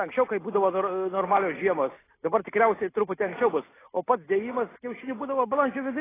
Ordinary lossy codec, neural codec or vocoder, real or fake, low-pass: AAC, 16 kbps; none; real; 3.6 kHz